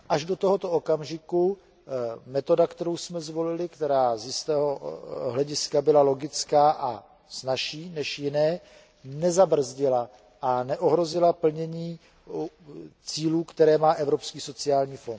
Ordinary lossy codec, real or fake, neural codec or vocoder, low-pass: none; real; none; none